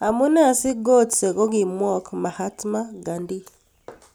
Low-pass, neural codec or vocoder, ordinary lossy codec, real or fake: none; none; none; real